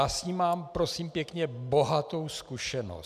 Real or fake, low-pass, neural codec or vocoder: real; 14.4 kHz; none